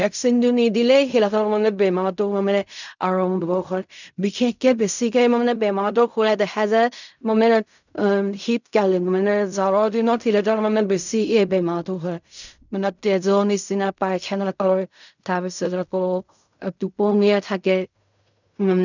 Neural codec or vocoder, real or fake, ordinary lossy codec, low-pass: codec, 16 kHz in and 24 kHz out, 0.4 kbps, LongCat-Audio-Codec, fine tuned four codebook decoder; fake; none; 7.2 kHz